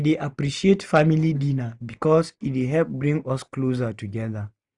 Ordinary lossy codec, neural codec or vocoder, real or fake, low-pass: none; none; real; 10.8 kHz